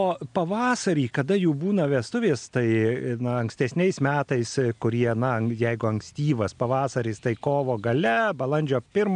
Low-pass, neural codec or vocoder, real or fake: 9.9 kHz; none; real